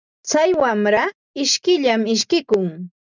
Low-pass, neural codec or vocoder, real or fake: 7.2 kHz; none; real